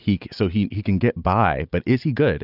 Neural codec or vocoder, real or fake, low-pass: none; real; 5.4 kHz